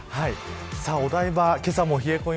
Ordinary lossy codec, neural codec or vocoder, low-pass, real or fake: none; none; none; real